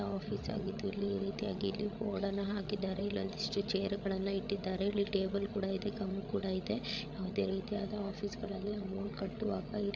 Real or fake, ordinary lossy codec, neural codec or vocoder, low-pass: fake; none; codec, 16 kHz, 16 kbps, FreqCodec, larger model; none